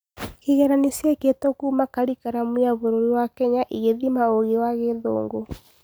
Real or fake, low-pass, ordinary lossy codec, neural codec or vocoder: real; none; none; none